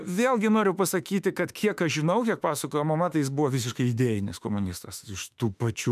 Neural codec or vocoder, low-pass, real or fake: autoencoder, 48 kHz, 32 numbers a frame, DAC-VAE, trained on Japanese speech; 14.4 kHz; fake